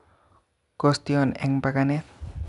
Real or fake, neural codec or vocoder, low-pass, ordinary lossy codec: real; none; 10.8 kHz; none